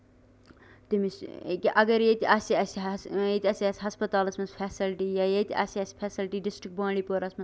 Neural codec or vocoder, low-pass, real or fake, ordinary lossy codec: none; none; real; none